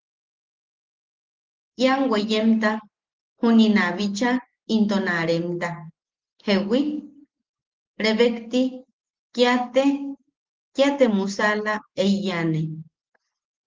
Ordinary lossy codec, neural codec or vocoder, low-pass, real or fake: Opus, 16 kbps; none; 7.2 kHz; real